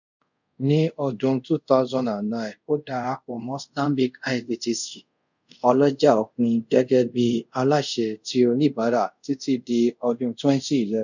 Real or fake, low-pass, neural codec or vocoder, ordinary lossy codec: fake; 7.2 kHz; codec, 24 kHz, 0.5 kbps, DualCodec; none